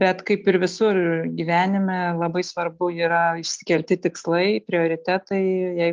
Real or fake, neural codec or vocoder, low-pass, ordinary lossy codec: real; none; 7.2 kHz; Opus, 24 kbps